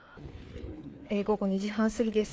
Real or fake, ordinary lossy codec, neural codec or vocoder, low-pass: fake; none; codec, 16 kHz, 4 kbps, FreqCodec, larger model; none